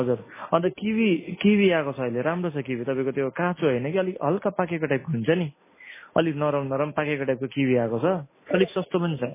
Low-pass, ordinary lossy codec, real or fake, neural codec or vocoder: 3.6 kHz; MP3, 16 kbps; real; none